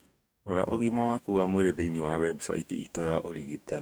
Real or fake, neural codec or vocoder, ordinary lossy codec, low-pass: fake; codec, 44.1 kHz, 2.6 kbps, DAC; none; none